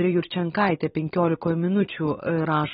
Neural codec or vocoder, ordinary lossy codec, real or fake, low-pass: vocoder, 44.1 kHz, 128 mel bands every 256 samples, BigVGAN v2; AAC, 16 kbps; fake; 19.8 kHz